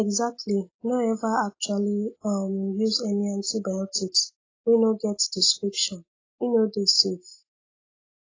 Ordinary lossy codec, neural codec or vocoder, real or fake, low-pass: AAC, 32 kbps; none; real; 7.2 kHz